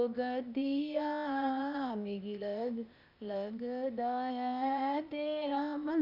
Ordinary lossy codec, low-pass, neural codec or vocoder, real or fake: none; 5.4 kHz; codec, 16 kHz, 0.8 kbps, ZipCodec; fake